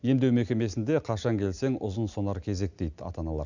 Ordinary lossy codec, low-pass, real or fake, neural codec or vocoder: none; 7.2 kHz; real; none